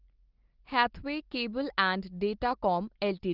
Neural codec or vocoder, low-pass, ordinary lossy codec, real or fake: none; 5.4 kHz; Opus, 16 kbps; real